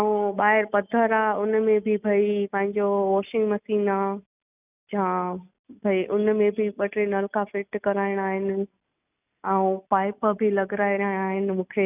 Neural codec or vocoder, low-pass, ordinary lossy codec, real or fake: none; 3.6 kHz; none; real